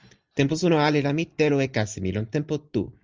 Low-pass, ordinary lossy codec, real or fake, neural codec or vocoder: 7.2 kHz; Opus, 24 kbps; real; none